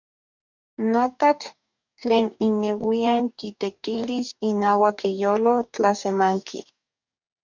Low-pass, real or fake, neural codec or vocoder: 7.2 kHz; fake; codec, 44.1 kHz, 2.6 kbps, DAC